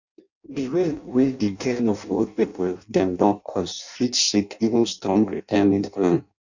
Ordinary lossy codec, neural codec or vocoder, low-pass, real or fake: none; codec, 16 kHz in and 24 kHz out, 0.6 kbps, FireRedTTS-2 codec; 7.2 kHz; fake